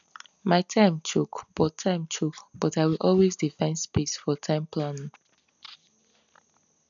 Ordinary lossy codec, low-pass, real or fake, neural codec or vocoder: none; 7.2 kHz; real; none